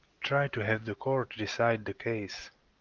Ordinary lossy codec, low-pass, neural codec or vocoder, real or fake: Opus, 32 kbps; 7.2 kHz; none; real